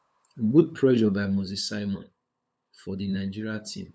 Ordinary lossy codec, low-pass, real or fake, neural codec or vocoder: none; none; fake; codec, 16 kHz, 8 kbps, FunCodec, trained on LibriTTS, 25 frames a second